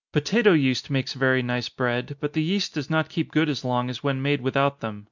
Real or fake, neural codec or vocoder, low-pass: real; none; 7.2 kHz